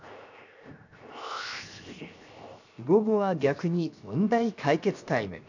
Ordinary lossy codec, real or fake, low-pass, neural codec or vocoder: none; fake; 7.2 kHz; codec, 16 kHz, 0.7 kbps, FocalCodec